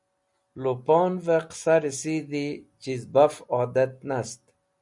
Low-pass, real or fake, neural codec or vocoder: 10.8 kHz; real; none